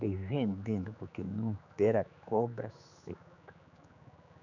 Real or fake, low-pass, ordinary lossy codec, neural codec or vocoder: fake; 7.2 kHz; none; codec, 16 kHz, 4 kbps, X-Codec, HuBERT features, trained on general audio